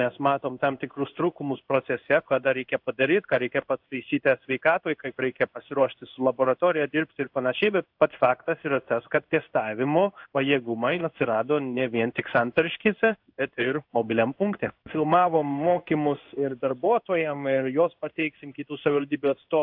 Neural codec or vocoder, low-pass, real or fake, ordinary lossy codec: codec, 16 kHz in and 24 kHz out, 1 kbps, XY-Tokenizer; 5.4 kHz; fake; AAC, 48 kbps